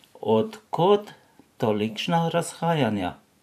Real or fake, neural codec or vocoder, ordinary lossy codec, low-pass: fake; vocoder, 44.1 kHz, 128 mel bands every 512 samples, BigVGAN v2; none; 14.4 kHz